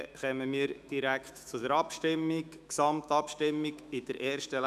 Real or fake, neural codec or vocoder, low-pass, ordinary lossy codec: fake; autoencoder, 48 kHz, 128 numbers a frame, DAC-VAE, trained on Japanese speech; 14.4 kHz; none